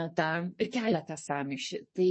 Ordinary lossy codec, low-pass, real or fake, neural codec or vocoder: MP3, 32 kbps; 9.9 kHz; fake; codec, 44.1 kHz, 2.6 kbps, SNAC